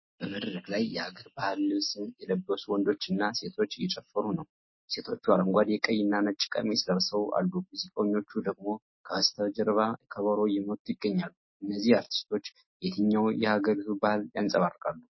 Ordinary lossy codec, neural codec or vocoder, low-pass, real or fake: MP3, 24 kbps; none; 7.2 kHz; real